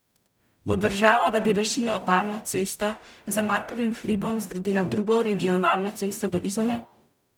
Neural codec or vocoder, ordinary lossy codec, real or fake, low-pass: codec, 44.1 kHz, 0.9 kbps, DAC; none; fake; none